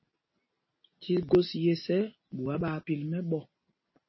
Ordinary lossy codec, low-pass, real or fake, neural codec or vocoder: MP3, 24 kbps; 7.2 kHz; real; none